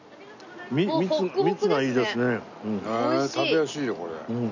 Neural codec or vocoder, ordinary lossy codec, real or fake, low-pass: none; none; real; 7.2 kHz